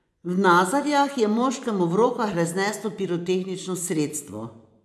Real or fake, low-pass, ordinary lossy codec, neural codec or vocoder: fake; none; none; vocoder, 24 kHz, 100 mel bands, Vocos